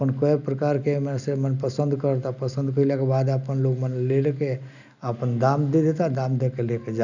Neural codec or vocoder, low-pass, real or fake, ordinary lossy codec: none; 7.2 kHz; real; none